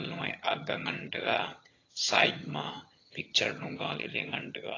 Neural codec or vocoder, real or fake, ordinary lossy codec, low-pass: vocoder, 22.05 kHz, 80 mel bands, HiFi-GAN; fake; AAC, 32 kbps; 7.2 kHz